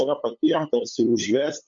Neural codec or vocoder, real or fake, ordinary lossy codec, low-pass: codec, 16 kHz, 8 kbps, FunCodec, trained on LibriTTS, 25 frames a second; fake; MP3, 64 kbps; 7.2 kHz